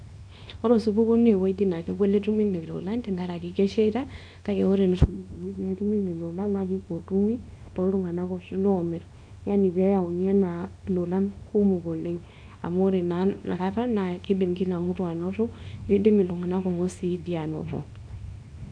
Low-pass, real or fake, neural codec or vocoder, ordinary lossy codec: 9.9 kHz; fake; codec, 24 kHz, 0.9 kbps, WavTokenizer, small release; none